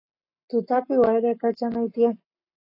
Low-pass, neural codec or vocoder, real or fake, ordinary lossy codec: 5.4 kHz; codec, 44.1 kHz, 7.8 kbps, Pupu-Codec; fake; AAC, 32 kbps